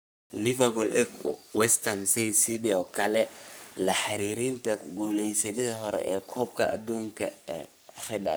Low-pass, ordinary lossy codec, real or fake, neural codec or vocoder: none; none; fake; codec, 44.1 kHz, 3.4 kbps, Pupu-Codec